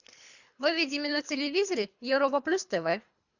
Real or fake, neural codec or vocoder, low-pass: fake; codec, 24 kHz, 3 kbps, HILCodec; 7.2 kHz